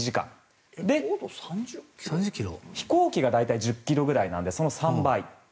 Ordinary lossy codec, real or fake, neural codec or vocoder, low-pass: none; real; none; none